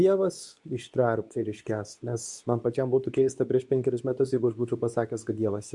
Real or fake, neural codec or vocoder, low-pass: fake; codec, 24 kHz, 0.9 kbps, WavTokenizer, medium speech release version 2; 10.8 kHz